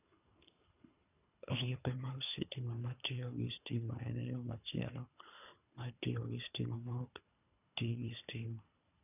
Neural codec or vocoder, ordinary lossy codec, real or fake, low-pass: codec, 24 kHz, 3 kbps, HILCodec; none; fake; 3.6 kHz